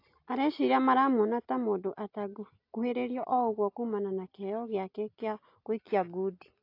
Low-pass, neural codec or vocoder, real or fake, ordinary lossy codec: 5.4 kHz; none; real; AAC, 32 kbps